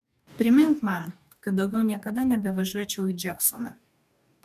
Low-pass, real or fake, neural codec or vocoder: 14.4 kHz; fake; codec, 44.1 kHz, 2.6 kbps, DAC